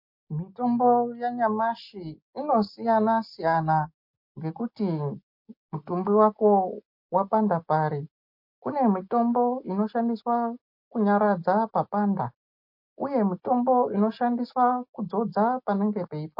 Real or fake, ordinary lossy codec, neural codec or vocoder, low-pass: real; MP3, 32 kbps; none; 5.4 kHz